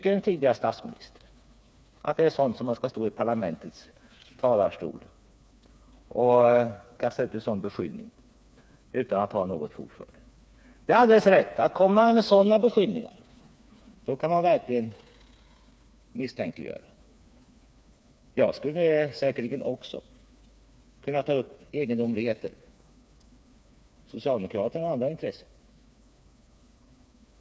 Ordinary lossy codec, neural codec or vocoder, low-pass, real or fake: none; codec, 16 kHz, 4 kbps, FreqCodec, smaller model; none; fake